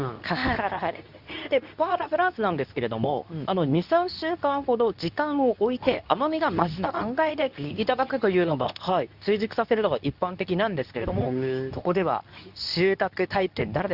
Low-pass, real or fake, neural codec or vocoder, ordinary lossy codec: 5.4 kHz; fake; codec, 24 kHz, 0.9 kbps, WavTokenizer, medium speech release version 2; Opus, 64 kbps